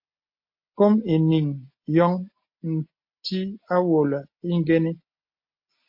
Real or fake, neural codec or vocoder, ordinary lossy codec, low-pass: real; none; MP3, 32 kbps; 5.4 kHz